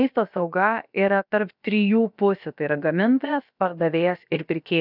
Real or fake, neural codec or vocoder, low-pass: fake; codec, 16 kHz, about 1 kbps, DyCAST, with the encoder's durations; 5.4 kHz